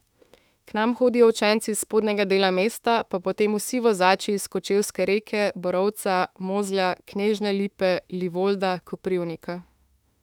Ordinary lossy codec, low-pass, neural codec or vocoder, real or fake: none; 19.8 kHz; autoencoder, 48 kHz, 32 numbers a frame, DAC-VAE, trained on Japanese speech; fake